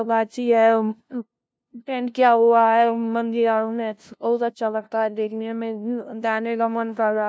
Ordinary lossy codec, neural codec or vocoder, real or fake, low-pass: none; codec, 16 kHz, 0.5 kbps, FunCodec, trained on LibriTTS, 25 frames a second; fake; none